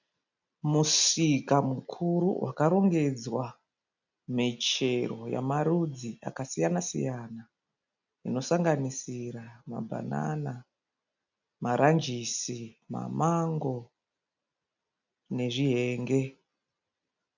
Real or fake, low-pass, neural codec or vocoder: real; 7.2 kHz; none